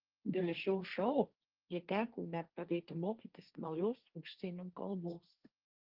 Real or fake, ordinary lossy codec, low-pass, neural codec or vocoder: fake; Opus, 32 kbps; 5.4 kHz; codec, 16 kHz, 1.1 kbps, Voila-Tokenizer